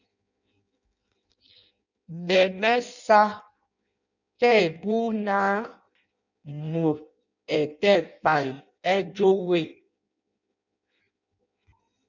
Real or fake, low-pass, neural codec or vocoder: fake; 7.2 kHz; codec, 16 kHz in and 24 kHz out, 0.6 kbps, FireRedTTS-2 codec